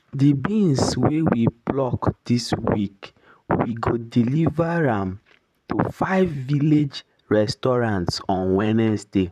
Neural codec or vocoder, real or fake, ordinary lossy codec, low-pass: vocoder, 44.1 kHz, 128 mel bands, Pupu-Vocoder; fake; none; 14.4 kHz